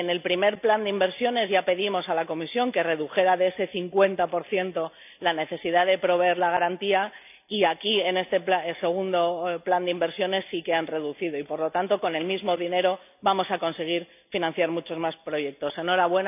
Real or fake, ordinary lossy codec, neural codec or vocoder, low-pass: real; none; none; 3.6 kHz